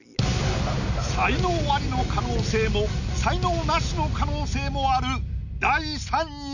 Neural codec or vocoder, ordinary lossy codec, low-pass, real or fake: none; none; 7.2 kHz; real